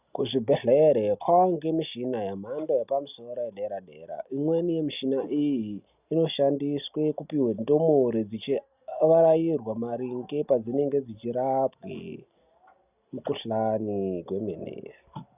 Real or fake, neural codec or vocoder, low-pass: real; none; 3.6 kHz